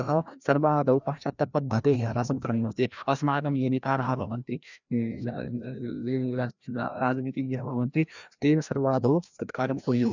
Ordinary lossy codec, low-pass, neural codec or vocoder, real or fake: none; 7.2 kHz; codec, 16 kHz, 1 kbps, FreqCodec, larger model; fake